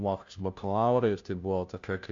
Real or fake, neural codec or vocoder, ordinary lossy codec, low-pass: fake; codec, 16 kHz, 0.5 kbps, FunCodec, trained on Chinese and English, 25 frames a second; AAC, 64 kbps; 7.2 kHz